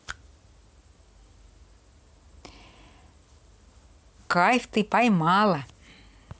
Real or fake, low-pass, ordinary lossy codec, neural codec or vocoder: real; none; none; none